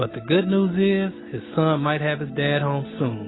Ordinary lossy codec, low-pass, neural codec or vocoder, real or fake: AAC, 16 kbps; 7.2 kHz; none; real